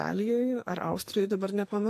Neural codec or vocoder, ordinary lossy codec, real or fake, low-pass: codec, 44.1 kHz, 2.6 kbps, SNAC; AAC, 64 kbps; fake; 14.4 kHz